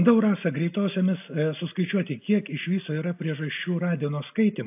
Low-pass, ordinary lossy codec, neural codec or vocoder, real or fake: 3.6 kHz; AAC, 32 kbps; none; real